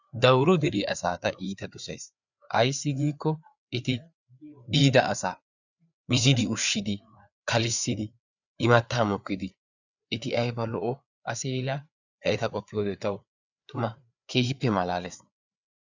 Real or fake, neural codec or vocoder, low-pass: fake; codec, 16 kHz, 4 kbps, FreqCodec, larger model; 7.2 kHz